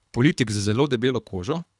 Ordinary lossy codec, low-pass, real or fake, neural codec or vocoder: none; 10.8 kHz; fake; codec, 24 kHz, 3 kbps, HILCodec